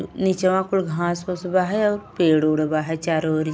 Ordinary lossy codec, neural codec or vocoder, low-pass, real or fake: none; none; none; real